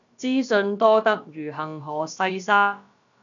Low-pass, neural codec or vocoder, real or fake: 7.2 kHz; codec, 16 kHz, about 1 kbps, DyCAST, with the encoder's durations; fake